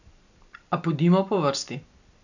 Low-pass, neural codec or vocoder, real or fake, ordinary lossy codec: 7.2 kHz; none; real; none